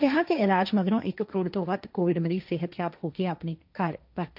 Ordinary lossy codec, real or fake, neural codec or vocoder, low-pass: none; fake; codec, 16 kHz, 1.1 kbps, Voila-Tokenizer; 5.4 kHz